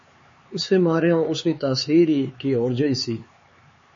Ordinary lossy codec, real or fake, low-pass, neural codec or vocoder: MP3, 32 kbps; fake; 7.2 kHz; codec, 16 kHz, 4 kbps, X-Codec, HuBERT features, trained on LibriSpeech